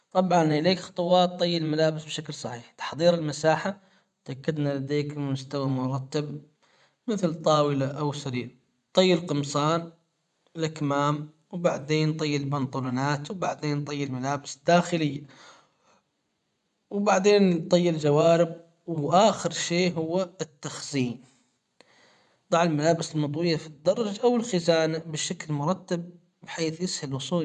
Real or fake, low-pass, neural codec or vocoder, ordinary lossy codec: fake; 10.8 kHz; vocoder, 24 kHz, 100 mel bands, Vocos; none